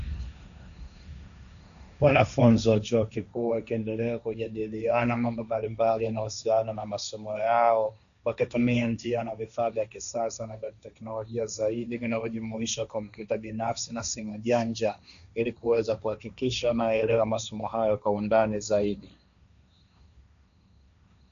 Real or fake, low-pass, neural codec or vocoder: fake; 7.2 kHz; codec, 16 kHz, 1.1 kbps, Voila-Tokenizer